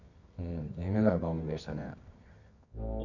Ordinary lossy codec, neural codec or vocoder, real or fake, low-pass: none; codec, 24 kHz, 0.9 kbps, WavTokenizer, medium music audio release; fake; 7.2 kHz